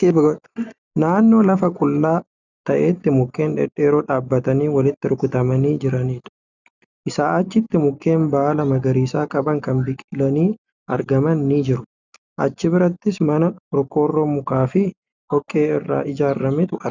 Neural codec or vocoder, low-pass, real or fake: none; 7.2 kHz; real